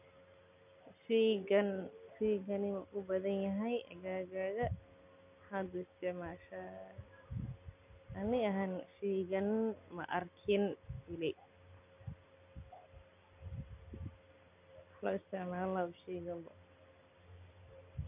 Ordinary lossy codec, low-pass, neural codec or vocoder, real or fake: none; 3.6 kHz; none; real